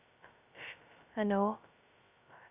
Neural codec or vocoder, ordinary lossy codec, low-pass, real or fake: codec, 16 kHz, 0.2 kbps, FocalCodec; none; 3.6 kHz; fake